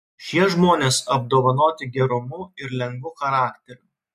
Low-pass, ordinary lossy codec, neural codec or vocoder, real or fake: 14.4 kHz; MP3, 64 kbps; none; real